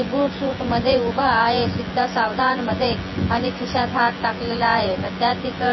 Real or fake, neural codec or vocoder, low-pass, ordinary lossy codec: fake; vocoder, 24 kHz, 100 mel bands, Vocos; 7.2 kHz; MP3, 24 kbps